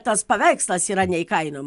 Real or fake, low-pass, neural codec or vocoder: real; 10.8 kHz; none